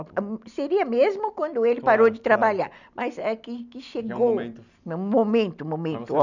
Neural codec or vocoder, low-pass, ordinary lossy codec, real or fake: none; 7.2 kHz; none; real